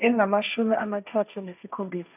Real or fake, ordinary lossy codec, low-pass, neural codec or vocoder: fake; none; 3.6 kHz; codec, 16 kHz, 1.1 kbps, Voila-Tokenizer